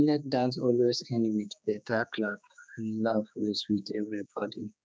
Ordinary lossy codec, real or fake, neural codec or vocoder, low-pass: none; fake; codec, 16 kHz, 2 kbps, X-Codec, HuBERT features, trained on general audio; none